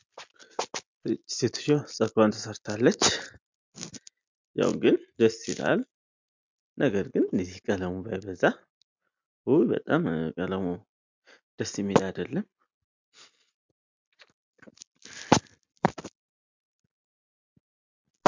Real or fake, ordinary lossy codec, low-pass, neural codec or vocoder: real; MP3, 64 kbps; 7.2 kHz; none